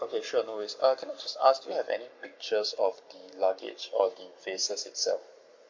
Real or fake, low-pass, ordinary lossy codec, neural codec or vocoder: fake; 7.2 kHz; MP3, 48 kbps; codec, 44.1 kHz, 7.8 kbps, Pupu-Codec